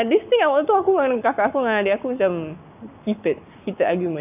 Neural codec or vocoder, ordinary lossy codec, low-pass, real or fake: codec, 44.1 kHz, 7.8 kbps, Pupu-Codec; none; 3.6 kHz; fake